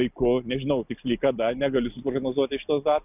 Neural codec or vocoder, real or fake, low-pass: none; real; 3.6 kHz